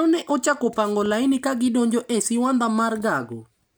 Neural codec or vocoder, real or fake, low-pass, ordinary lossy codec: none; real; none; none